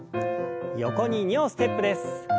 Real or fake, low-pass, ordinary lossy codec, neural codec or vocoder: real; none; none; none